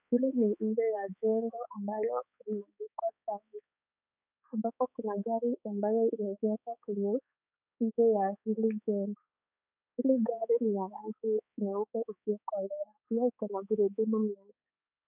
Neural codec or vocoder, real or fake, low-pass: codec, 16 kHz, 4 kbps, X-Codec, HuBERT features, trained on balanced general audio; fake; 3.6 kHz